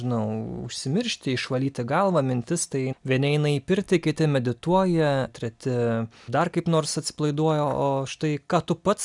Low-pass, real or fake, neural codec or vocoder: 10.8 kHz; real; none